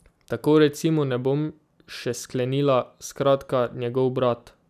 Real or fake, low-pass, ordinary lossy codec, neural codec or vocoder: real; 14.4 kHz; none; none